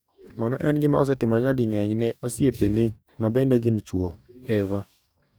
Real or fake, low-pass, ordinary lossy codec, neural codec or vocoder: fake; none; none; codec, 44.1 kHz, 2.6 kbps, DAC